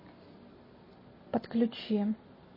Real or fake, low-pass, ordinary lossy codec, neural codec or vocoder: real; 5.4 kHz; MP3, 24 kbps; none